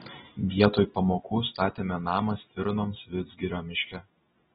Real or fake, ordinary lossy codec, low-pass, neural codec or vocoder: real; AAC, 16 kbps; 7.2 kHz; none